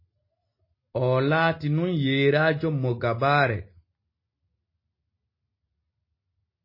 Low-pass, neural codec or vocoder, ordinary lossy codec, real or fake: 5.4 kHz; none; MP3, 24 kbps; real